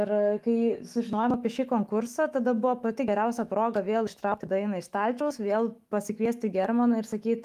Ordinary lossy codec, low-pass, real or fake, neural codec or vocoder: Opus, 32 kbps; 14.4 kHz; fake; autoencoder, 48 kHz, 128 numbers a frame, DAC-VAE, trained on Japanese speech